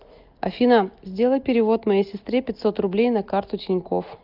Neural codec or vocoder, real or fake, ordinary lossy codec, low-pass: none; real; Opus, 24 kbps; 5.4 kHz